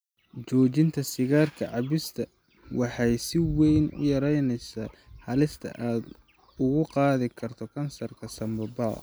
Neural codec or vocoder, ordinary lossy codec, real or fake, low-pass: none; none; real; none